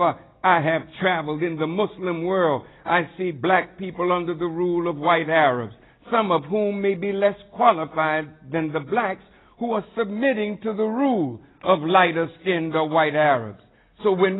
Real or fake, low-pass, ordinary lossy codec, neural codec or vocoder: real; 7.2 kHz; AAC, 16 kbps; none